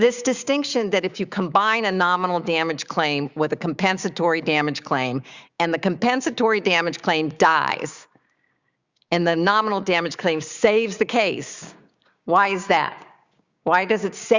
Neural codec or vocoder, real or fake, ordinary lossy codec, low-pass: codec, 16 kHz, 6 kbps, DAC; fake; Opus, 64 kbps; 7.2 kHz